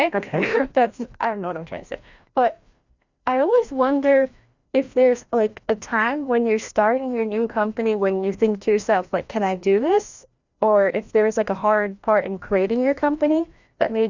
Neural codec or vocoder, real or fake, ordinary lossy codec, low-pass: codec, 16 kHz, 1 kbps, FreqCodec, larger model; fake; Opus, 64 kbps; 7.2 kHz